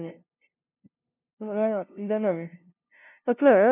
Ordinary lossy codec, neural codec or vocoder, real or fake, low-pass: none; codec, 16 kHz, 0.5 kbps, FunCodec, trained on LibriTTS, 25 frames a second; fake; 3.6 kHz